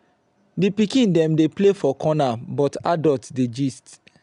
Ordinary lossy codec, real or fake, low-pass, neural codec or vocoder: none; real; 10.8 kHz; none